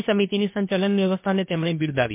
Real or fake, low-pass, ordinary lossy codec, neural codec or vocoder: fake; 3.6 kHz; MP3, 24 kbps; codec, 16 kHz, about 1 kbps, DyCAST, with the encoder's durations